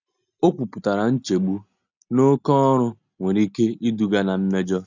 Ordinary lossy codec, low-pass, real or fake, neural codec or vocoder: none; 7.2 kHz; real; none